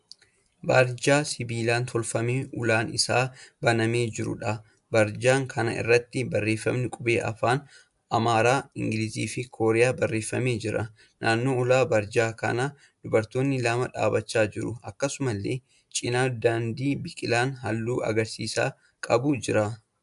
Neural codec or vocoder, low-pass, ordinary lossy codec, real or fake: none; 10.8 kHz; AAC, 96 kbps; real